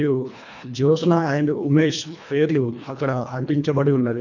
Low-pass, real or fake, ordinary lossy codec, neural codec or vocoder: 7.2 kHz; fake; none; codec, 24 kHz, 1.5 kbps, HILCodec